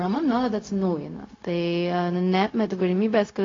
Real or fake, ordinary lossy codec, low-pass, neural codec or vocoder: fake; AAC, 32 kbps; 7.2 kHz; codec, 16 kHz, 0.4 kbps, LongCat-Audio-Codec